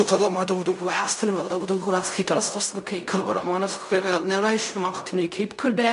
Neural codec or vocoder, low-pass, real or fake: codec, 16 kHz in and 24 kHz out, 0.4 kbps, LongCat-Audio-Codec, fine tuned four codebook decoder; 10.8 kHz; fake